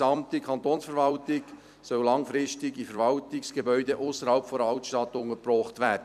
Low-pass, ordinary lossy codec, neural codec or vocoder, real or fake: 14.4 kHz; none; vocoder, 44.1 kHz, 128 mel bands every 256 samples, BigVGAN v2; fake